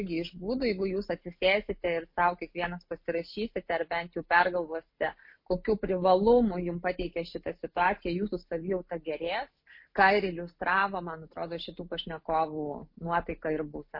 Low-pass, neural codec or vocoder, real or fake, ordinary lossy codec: 5.4 kHz; none; real; MP3, 32 kbps